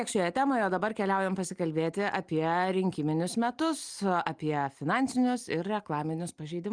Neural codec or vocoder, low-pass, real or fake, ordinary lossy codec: none; 9.9 kHz; real; Opus, 32 kbps